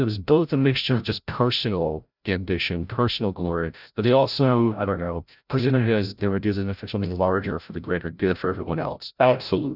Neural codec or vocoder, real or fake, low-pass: codec, 16 kHz, 0.5 kbps, FreqCodec, larger model; fake; 5.4 kHz